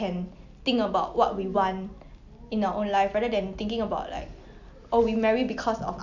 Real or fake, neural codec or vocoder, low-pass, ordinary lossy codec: real; none; 7.2 kHz; none